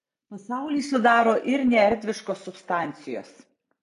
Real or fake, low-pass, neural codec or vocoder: real; 10.8 kHz; none